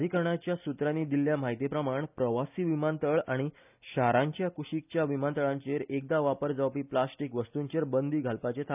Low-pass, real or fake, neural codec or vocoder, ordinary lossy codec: 3.6 kHz; real; none; none